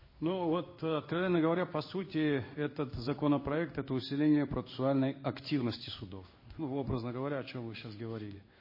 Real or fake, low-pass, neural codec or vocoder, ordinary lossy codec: fake; 5.4 kHz; codec, 16 kHz in and 24 kHz out, 1 kbps, XY-Tokenizer; MP3, 24 kbps